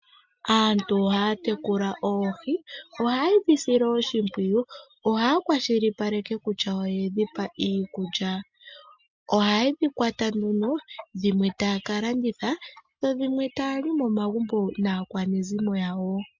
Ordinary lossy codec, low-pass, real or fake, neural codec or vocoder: MP3, 48 kbps; 7.2 kHz; real; none